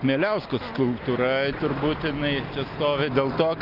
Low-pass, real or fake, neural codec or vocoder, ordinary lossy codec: 5.4 kHz; real; none; Opus, 24 kbps